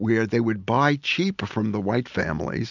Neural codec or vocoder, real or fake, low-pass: none; real; 7.2 kHz